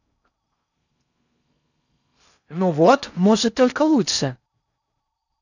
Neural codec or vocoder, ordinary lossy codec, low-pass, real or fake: codec, 16 kHz in and 24 kHz out, 0.6 kbps, FocalCodec, streaming, 4096 codes; none; 7.2 kHz; fake